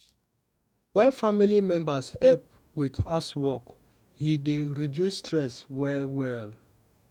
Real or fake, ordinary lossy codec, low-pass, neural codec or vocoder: fake; none; 19.8 kHz; codec, 44.1 kHz, 2.6 kbps, DAC